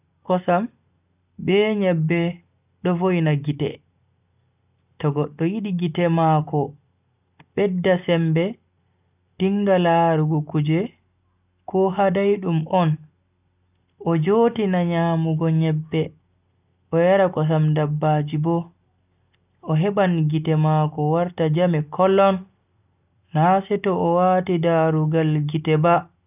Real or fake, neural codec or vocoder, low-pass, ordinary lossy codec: real; none; 3.6 kHz; none